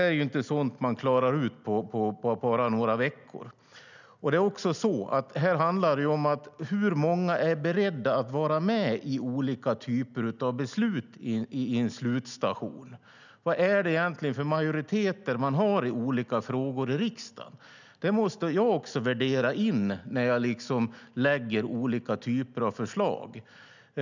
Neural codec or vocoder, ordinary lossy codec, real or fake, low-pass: none; none; real; 7.2 kHz